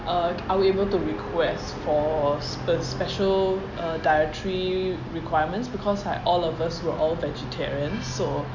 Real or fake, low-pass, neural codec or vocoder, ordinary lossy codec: real; 7.2 kHz; none; none